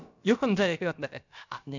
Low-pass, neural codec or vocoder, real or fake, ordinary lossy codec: 7.2 kHz; codec, 16 kHz, about 1 kbps, DyCAST, with the encoder's durations; fake; none